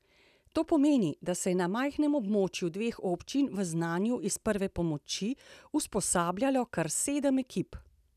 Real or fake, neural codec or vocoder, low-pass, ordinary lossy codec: real; none; 14.4 kHz; none